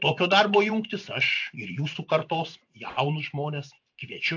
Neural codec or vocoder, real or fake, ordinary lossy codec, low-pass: vocoder, 44.1 kHz, 128 mel bands every 512 samples, BigVGAN v2; fake; AAC, 48 kbps; 7.2 kHz